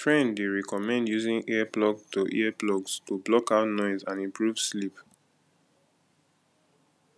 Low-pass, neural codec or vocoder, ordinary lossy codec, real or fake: none; none; none; real